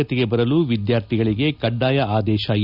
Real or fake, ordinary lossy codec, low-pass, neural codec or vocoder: real; none; 5.4 kHz; none